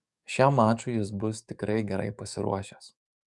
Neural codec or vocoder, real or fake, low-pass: codec, 44.1 kHz, 7.8 kbps, DAC; fake; 10.8 kHz